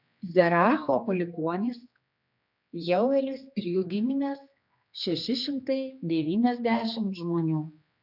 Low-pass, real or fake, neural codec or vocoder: 5.4 kHz; fake; codec, 16 kHz, 2 kbps, X-Codec, HuBERT features, trained on general audio